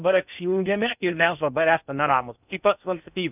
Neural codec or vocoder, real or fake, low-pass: codec, 16 kHz in and 24 kHz out, 0.6 kbps, FocalCodec, streaming, 2048 codes; fake; 3.6 kHz